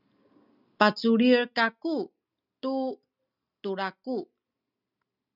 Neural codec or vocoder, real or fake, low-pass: none; real; 5.4 kHz